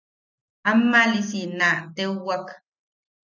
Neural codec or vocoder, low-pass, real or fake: none; 7.2 kHz; real